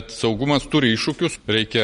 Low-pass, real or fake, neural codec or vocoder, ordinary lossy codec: 10.8 kHz; real; none; MP3, 48 kbps